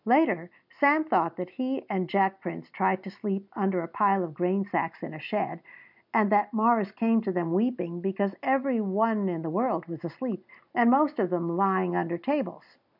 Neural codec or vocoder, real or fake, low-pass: none; real; 5.4 kHz